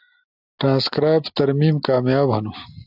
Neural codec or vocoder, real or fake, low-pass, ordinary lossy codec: none; real; 5.4 kHz; AAC, 48 kbps